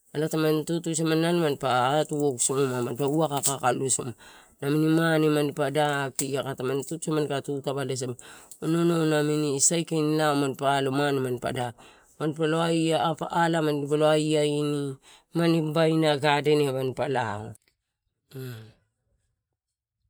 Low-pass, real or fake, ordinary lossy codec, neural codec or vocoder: none; fake; none; vocoder, 44.1 kHz, 128 mel bands every 512 samples, BigVGAN v2